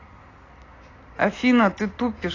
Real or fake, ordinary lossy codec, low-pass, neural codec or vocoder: real; AAC, 32 kbps; 7.2 kHz; none